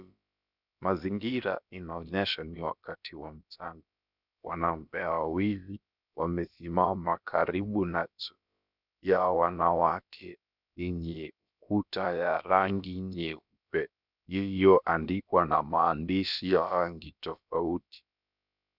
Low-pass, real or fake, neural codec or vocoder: 5.4 kHz; fake; codec, 16 kHz, about 1 kbps, DyCAST, with the encoder's durations